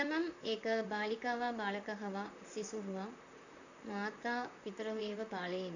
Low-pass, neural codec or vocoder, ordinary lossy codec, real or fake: 7.2 kHz; vocoder, 44.1 kHz, 128 mel bands, Pupu-Vocoder; none; fake